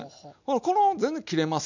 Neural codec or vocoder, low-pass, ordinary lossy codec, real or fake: none; 7.2 kHz; none; real